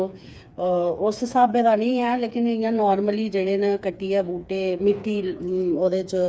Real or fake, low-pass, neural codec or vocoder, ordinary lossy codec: fake; none; codec, 16 kHz, 4 kbps, FreqCodec, smaller model; none